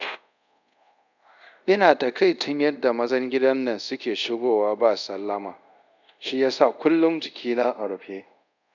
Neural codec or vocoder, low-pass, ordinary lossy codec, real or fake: codec, 24 kHz, 0.5 kbps, DualCodec; 7.2 kHz; none; fake